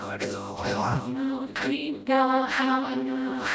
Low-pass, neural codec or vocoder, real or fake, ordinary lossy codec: none; codec, 16 kHz, 0.5 kbps, FreqCodec, smaller model; fake; none